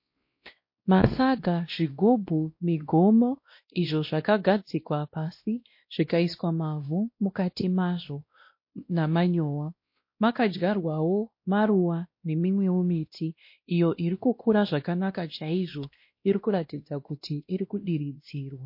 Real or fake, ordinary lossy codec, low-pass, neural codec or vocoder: fake; MP3, 32 kbps; 5.4 kHz; codec, 16 kHz, 1 kbps, X-Codec, WavLM features, trained on Multilingual LibriSpeech